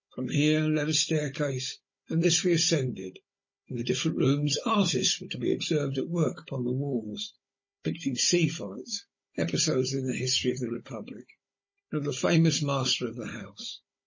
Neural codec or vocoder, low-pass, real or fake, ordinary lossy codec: codec, 16 kHz, 16 kbps, FunCodec, trained on Chinese and English, 50 frames a second; 7.2 kHz; fake; MP3, 32 kbps